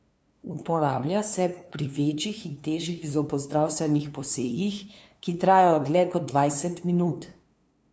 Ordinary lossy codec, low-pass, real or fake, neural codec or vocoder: none; none; fake; codec, 16 kHz, 2 kbps, FunCodec, trained on LibriTTS, 25 frames a second